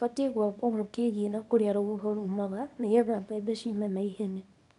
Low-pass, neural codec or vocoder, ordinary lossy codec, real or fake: 10.8 kHz; codec, 24 kHz, 0.9 kbps, WavTokenizer, small release; none; fake